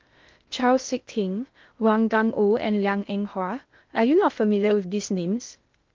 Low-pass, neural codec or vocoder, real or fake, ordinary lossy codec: 7.2 kHz; codec, 16 kHz in and 24 kHz out, 0.6 kbps, FocalCodec, streaming, 2048 codes; fake; Opus, 32 kbps